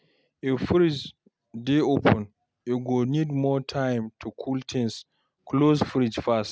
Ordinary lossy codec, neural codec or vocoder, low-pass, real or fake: none; none; none; real